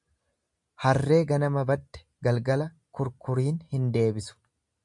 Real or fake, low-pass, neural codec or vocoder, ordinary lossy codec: real; 10.8 kHz; none; MP3, 96 kbps